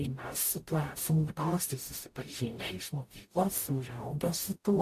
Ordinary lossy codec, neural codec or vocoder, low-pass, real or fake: AAC, 64 kbps; codec, 44.1 kHz, 0.9 kbps, DAC; 14.4 kHz; fake